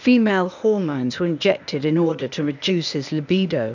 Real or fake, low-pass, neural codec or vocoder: fake; 7.2 kHz; codec, 16 kHz, 0.8 kbps, ZipCodec